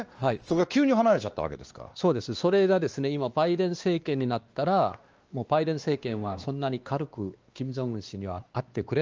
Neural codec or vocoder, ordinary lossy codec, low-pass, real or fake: codec, 16 kHz, 2 kbps, X-Codec, WavLM features, trained on Multilingual LibriSpeech; Opus, 24 kbps; 7.2 kHz; fake